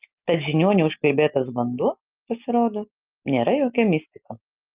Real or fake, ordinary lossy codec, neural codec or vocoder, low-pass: real; Opus, 24 kbps; none; 3.6 kHz